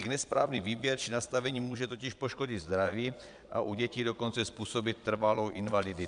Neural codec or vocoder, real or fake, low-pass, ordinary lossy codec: vocoder, 22.05 kHz, 80 mel bands, Vocos; fake; 9.9 kHz; MP3, 96 kbps